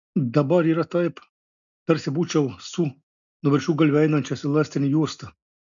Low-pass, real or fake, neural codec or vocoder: 7.2 kHz; real; none